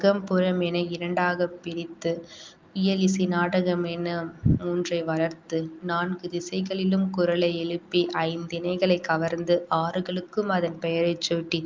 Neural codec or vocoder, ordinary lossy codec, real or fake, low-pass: none; Opus, 24 kbps; real; 7.2 kHz